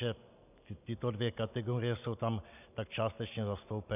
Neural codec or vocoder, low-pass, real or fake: none; 3.6 kHz; real